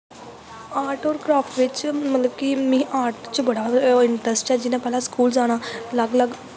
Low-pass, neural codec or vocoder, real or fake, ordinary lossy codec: none; none; real; none